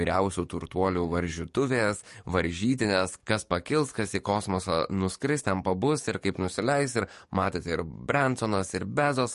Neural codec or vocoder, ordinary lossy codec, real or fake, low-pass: codec, 44.1 kHz, 7.8 kbps, DAC; MP3, 48 kbps; fake; 14.4 kHz